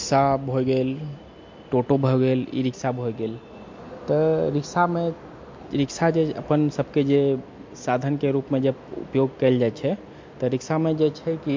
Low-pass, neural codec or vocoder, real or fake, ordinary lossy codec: 7.2 kHz; none; real; MP3, 48 kbps